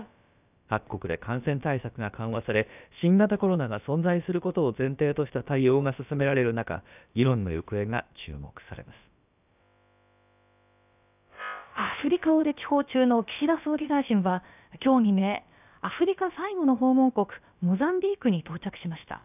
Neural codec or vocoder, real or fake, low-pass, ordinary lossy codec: codec, 16 kHz, about 1 kbps, DyCAST, with the encoder's durations; fake; 3.6 kHz; none